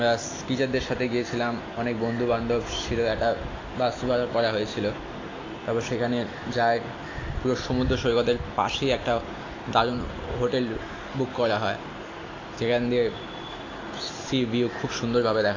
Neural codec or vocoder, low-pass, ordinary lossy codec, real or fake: none; 7.2 kHz; AAC, 32 kbps; real